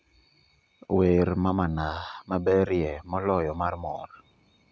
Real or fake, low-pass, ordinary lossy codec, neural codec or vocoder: real; none; none; none